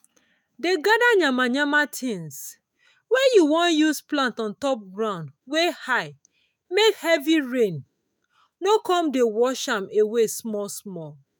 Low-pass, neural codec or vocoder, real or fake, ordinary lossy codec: none; autoencoder, 48 kHz, 128 numbers a frame, DAC-VAE, trained on Japanese speech; fake; none